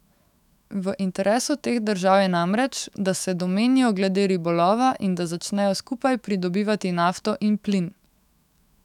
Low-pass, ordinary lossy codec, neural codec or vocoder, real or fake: 19.8 kHz; none; autoencoder, 48 kHz, 128 numbers a frame, DAC-VAE, trained on Japanese speech; fake